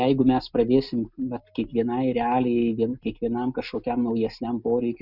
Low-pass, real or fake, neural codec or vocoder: 5.4 kHz; real; none